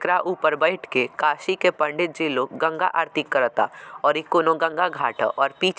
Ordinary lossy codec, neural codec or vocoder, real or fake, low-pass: none; none; real; none